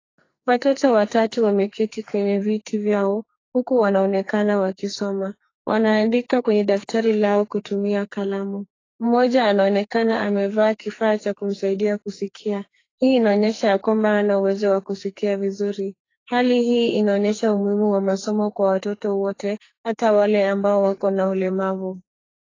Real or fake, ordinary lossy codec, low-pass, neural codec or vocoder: fake; AAC, 32 kbps; 7.2 kHz; codec, 44.1 kHz, 2.6 kbps, SNAC